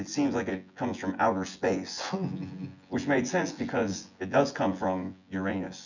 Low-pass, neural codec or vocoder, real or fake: 7.2 kHz; vocoder, 24 kHz, 100 mel bands, Vocos; fake